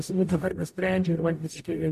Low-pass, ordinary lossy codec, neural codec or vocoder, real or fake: 14.4 kHz; MP3, 64 kbps; codec, 44.1 kHz, 0.9 kbps, DAC; fake